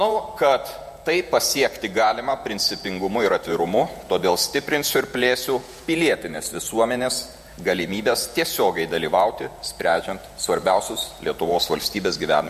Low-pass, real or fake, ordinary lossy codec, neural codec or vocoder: 14.4 kHz; fake; MP3, 64 kbps; vocoder, 48 kHz, 128 mel bands, Vocos